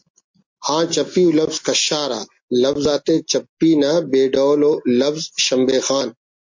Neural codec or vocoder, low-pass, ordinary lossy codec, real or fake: none; 7.2 kHz; MP3, 48 kbps; real